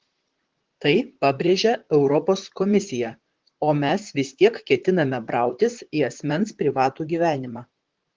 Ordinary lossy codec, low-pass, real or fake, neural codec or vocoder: Opus, 16 kbps; 7.2 kHz; fake; vocoder, 44.1 kHz, 128 mel bands, Pupu-Vocoder